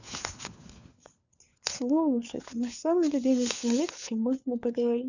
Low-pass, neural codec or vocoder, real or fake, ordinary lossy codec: 7.2 kHz; codec, 16 kHz, 4 kbps, FunCodec, trained on LibriTTS, 50 frames a second; fake; none